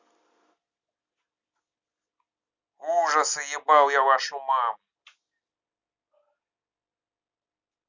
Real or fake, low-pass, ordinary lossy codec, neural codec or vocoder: real; 7.2 kHz; Opus, 64 kbps; none